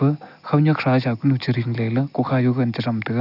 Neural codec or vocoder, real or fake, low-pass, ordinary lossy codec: none; real; 5.4 kHz; none